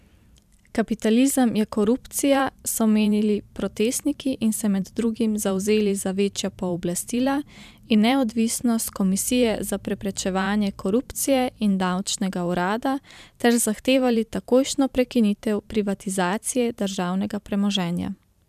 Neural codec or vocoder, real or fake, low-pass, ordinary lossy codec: vocoder, 44.1 kHz, 128 mel bands every 512 samples, BigVGAN v2; fake; 14.4 kHz; none